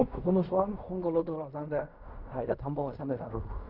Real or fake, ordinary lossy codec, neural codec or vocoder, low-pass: fake; none; codec, 16 kHz in and 24 kHz out, 0.4 kbps, LongCat-Audio-Codec, fine tuned four codebook decoder; 5.4 kHz